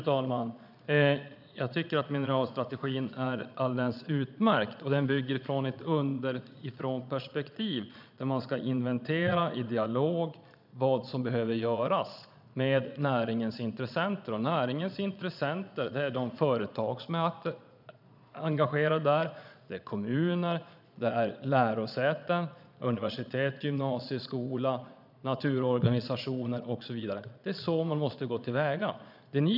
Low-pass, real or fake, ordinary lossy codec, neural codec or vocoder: 5.4 kHz; fake; none; vocoder, 22.05 kHz, 80 mel bands, Vocos